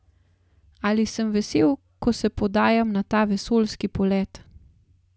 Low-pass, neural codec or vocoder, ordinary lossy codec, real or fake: none; none; none; real